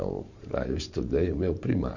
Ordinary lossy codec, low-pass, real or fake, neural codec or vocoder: none; 7.2 kHz; fake; vocoder, 44.1 kHz, 128 mel bands every 512 samples, BigVGAN v2